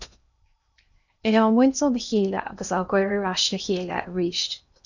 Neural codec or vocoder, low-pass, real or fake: codec, 16 kHz in and 24 kHz out, 0.8 kbps, FocalCodec, streaming, 65536 codes; 7.2 kHz; fake